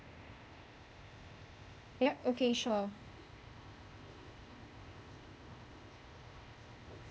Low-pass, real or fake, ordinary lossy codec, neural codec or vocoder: none; fake; none; codec, 16 kHz, 0.8 kbps, ZipCodec